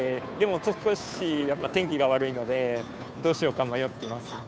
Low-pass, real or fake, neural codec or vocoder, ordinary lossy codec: none; fake; codec, 16 kHz, 2 kbps, FunCodec, trained on Chinese and English, 25 frames a second; none